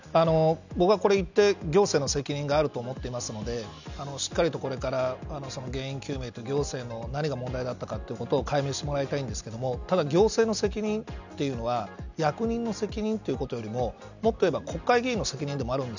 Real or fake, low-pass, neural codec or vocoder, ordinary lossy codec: real; 7.2 kHz; none; none